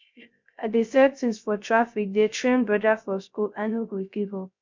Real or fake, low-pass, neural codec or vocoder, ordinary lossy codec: fake; 7.2 kHz; codec, 16 kHz, 0.3 kbps, FocalCodec; MP3, 64 kbps